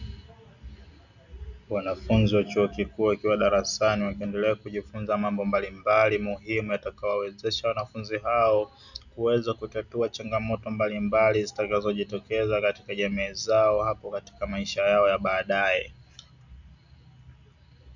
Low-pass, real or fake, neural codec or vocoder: 7.2 kHz; real; none